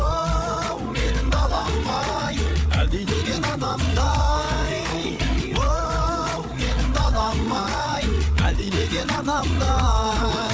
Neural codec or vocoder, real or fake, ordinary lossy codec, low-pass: codec, 16 kHz, 8 kbps, FreqCodec, larger model; fake; none; none